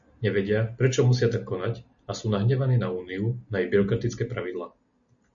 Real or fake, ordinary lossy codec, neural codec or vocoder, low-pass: real; MP3, 64 kbps; none; 7.2 kHz